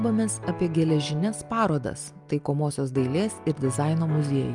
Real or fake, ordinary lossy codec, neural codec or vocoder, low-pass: real; Opus, 32 kbps; none; 10.8 kHz